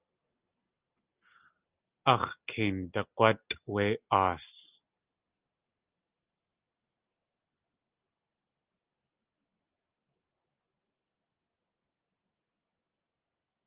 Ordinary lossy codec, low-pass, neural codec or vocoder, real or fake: Opus, 24 kbps; 3.6 kHz; none; real